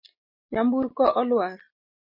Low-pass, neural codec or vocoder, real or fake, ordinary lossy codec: 5.4 kHz; none; real; MP3, 24 kbps